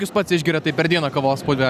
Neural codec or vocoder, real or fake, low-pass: none; real; 14.4 kHz